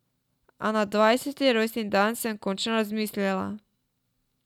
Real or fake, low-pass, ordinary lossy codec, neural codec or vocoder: real; 19.8 kHz; none; none